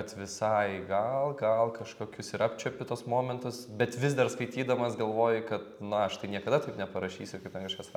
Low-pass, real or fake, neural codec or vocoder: 19.8 kHz; real; none